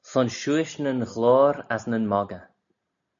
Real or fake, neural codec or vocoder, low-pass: real; none; 7.2 kHz